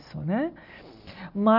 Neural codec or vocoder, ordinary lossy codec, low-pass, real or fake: none; none; 5.4 kHz; real